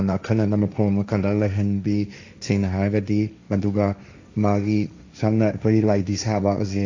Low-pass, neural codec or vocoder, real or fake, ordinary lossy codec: 7.2 kHz; codec, 16 kHz, 1.1 kbps, Voila-Tokenizer; fake; AAC, 48 kbps